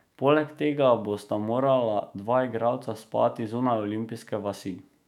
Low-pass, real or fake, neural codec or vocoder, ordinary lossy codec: 19.8 kHz; fake; autoencoder, 48 kHz, 128 numbers a frame, DAC-VAE, trained on Japanese speech; none